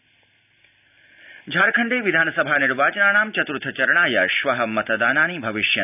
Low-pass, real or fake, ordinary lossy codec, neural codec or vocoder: 3.6 kHz; real; none; none